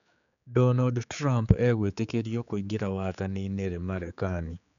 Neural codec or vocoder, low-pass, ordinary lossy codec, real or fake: codec, 16 kHz, 4 kbps, X-Codec, HuBERT features, trained on general audio; 7.2 kHz; none; fake